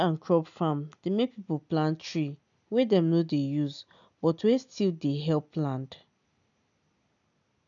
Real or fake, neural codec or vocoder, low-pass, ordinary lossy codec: real; none; 7.2 kHz; none